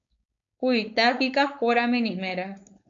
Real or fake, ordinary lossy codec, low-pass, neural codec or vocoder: fake; AAC, 64 kbps; 7.2 kHz; codec, 16 kHz, 4.8 kbps, FACodec